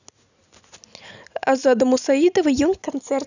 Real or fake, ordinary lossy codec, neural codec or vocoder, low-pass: real; none; none; 7.2 kHz